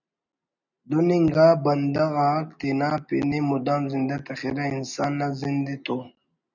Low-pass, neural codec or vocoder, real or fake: 7.2 kHz; none; real